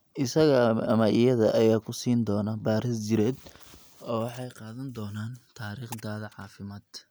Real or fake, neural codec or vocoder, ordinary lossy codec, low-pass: real; none; none; none